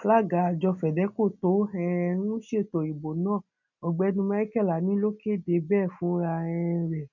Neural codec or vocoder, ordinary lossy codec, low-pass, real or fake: none; none; 7.2 kHz; real